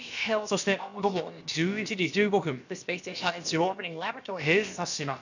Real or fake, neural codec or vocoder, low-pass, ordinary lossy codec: fake; codec, 16 kHz, about 1 kbps, DyCAST, with the encoder's durations; 7.2 kHz; none